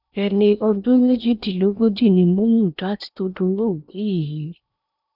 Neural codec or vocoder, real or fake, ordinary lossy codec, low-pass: codec, 16 kHz in and 24 kHz out, 0.8 kbps, FocalCodec, streaming, 65536 codes; fake; none; 5.4 kHz